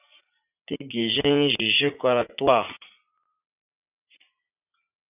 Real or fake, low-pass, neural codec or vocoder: fake; 3.6 kHz; vocoder, 44.1 kHz, 80 mel bands, Vocos